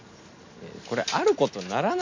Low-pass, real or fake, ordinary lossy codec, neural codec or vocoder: 7.2 kHz; real; MP3, 64 kbps; none